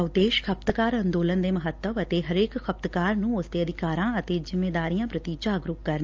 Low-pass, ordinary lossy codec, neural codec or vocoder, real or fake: 7.2 kHz; Opus, 24 kbps; none; real